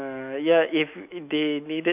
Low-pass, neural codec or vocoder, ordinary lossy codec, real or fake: 3.6 kHz; autoencoder, 48 kHz, 128 numbers a frame, DAC-VAE, trained on Japanese speech; none; fake